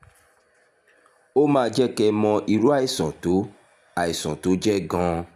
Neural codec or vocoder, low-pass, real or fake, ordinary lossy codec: none; 14.4 kHz; real; none